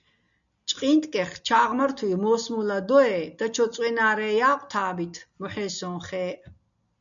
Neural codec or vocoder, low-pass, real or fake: none; 7.2 kHz; real